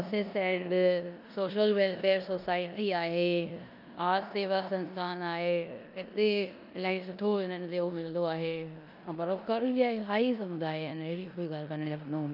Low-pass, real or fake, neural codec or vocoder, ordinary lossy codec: 5.4 kHz; fake; codec, 16 kHz in and 24 kHz out, 0.9 kbps, LongCat-Audio-Codec, four codebook decoder; none